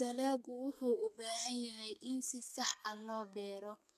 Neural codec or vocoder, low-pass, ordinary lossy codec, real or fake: codec, 32 kHz, 1.9 kbps, SNAC; 14.4 kHz; none; fake